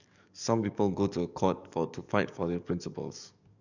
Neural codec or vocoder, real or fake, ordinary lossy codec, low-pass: codec, 44.1 kHz, 7.8 kbps, DAC; fake; none; 7.2 kHz